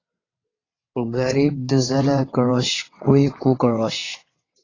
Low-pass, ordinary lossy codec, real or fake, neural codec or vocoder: 7.2 kHz; AAC, 32 kbps; fake; vocoder, 22.05 kHz, 80 mel bands, WaveNeXt